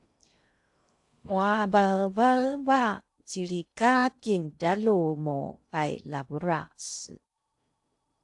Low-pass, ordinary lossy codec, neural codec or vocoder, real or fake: 10.8 kHz; AAC, 64 kbps; codec, 16 kHz in and 24 kHz out, 0.6 kbps, FocalCodec, streaming, 2048 codes; fake